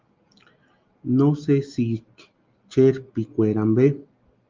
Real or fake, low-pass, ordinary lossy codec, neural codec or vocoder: real; 7.2 kHz; Opus, 24 kbps; none